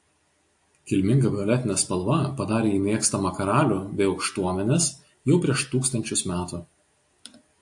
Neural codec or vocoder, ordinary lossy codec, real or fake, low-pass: none; AAC, 64 kbps; real; 10.8 kHz